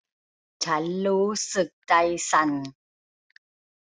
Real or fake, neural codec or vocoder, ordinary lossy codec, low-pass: real; none; none; none